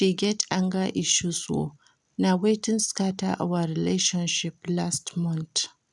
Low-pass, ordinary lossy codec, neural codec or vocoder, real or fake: 10.8 kHz; none; none; real